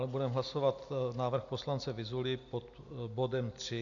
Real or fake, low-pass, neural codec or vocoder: real; 7.2 kHz; none